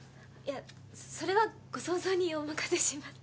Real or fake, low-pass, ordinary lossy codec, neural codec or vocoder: real; none; none; none